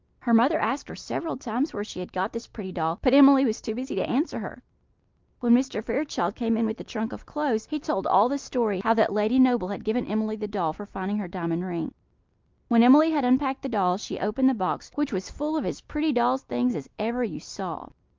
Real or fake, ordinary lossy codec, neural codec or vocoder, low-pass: real; Opus, 32 kbps; none; 7.2 kHz